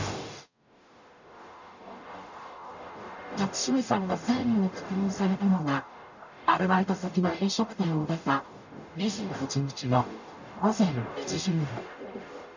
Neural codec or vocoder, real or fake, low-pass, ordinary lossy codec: codec, 44.1 kHz, 0.9 kbps, DAC; fake; 7.2 kHz; none